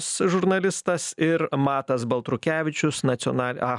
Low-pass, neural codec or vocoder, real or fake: 10.8 kHz; none; real